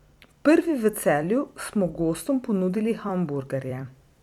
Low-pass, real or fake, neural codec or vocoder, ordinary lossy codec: 19.8 kHz; fake; vocoder, 44.1 kHz, 128 mel bands every 256 samples, BigVGAN v2; none